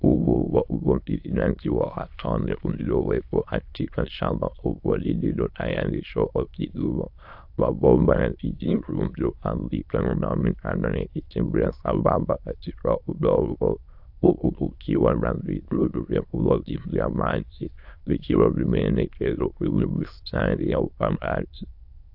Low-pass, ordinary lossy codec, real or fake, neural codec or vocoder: 5.4 kHz; AAC, 48 kbps; fake; autoencoder, 22.05 kHz, a latent of 192 numbers a frame, VITS, trained on many speakers